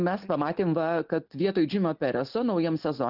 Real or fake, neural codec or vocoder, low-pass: real; none; 5.4 kHz